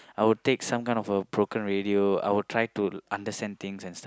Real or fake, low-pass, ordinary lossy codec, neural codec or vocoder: real; none; none; none